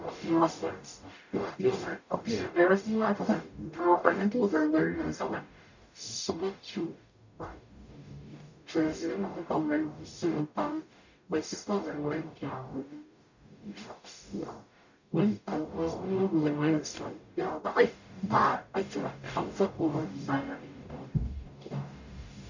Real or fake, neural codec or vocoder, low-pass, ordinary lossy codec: fake; codec, 44.1 kHz, 0.9 kbps, DAC; 7.2 kHz; none